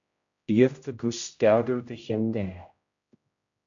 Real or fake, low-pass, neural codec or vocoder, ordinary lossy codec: fake; 7.2 kHz; codec, 16 kHz, 0.5 kbps, X-Codec, HuBERT features, trained on general audio; AAC, 64 kbps